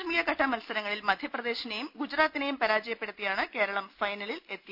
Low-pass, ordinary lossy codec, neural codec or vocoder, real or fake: 5.4 kHz; none; none; real